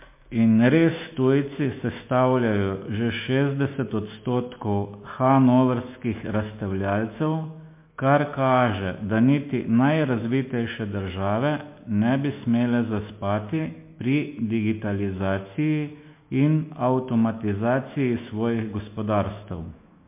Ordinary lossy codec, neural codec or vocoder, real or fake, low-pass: MP3, 24 kbps; autoencoder, 48 kHz, 128 numbers a frame, DAC-VAE, trained on Japanese speech; fake; 3.6 kHz